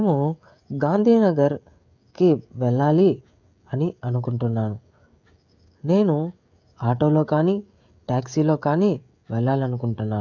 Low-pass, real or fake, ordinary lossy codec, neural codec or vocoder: 7.2 kHz; fake; none; codec, 16 kHz, 16 kbps, FreqCodec, smaller model